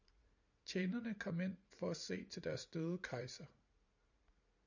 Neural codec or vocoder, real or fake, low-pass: vocoder, 44.1 kHz, 80 mel bands, Vocos; fake; 7.2 kHz